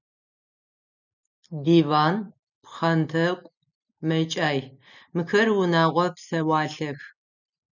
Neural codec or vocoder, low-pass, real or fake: none; 7.2 kHz; real